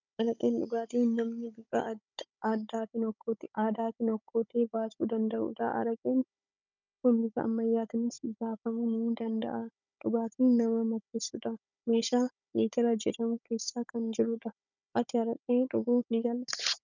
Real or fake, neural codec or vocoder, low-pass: fake; codec, 16 kHz, 16 kbps, FunCodec, trained on Chinese and English, 50 frames a second; 7.2 kHz